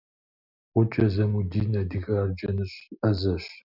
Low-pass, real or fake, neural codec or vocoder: 5.4 kHz; real; none